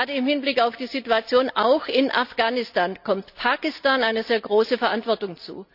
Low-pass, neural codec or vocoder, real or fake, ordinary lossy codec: 5.4 kHz; none; real; none